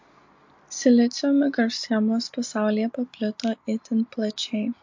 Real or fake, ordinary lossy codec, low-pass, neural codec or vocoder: real; MP3, 48 kbps; 7.2 kHz; none